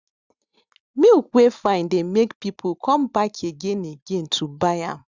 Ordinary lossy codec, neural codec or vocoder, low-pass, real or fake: Opus, 64 kbps; none; 7.2 kHz; real